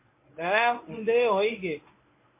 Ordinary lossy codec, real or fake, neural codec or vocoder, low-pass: MP3, 24 kbps; fake; codec, 24 kHz, 0.9 kbps, WavTokenizer, medium speech release version 1; 3.6 kHz